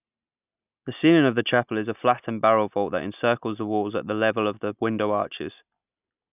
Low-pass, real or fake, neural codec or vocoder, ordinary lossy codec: 3.6 kHz; real; none; none